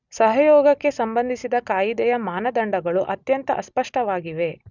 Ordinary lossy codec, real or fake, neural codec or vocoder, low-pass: none; real; none; 7.2 kHz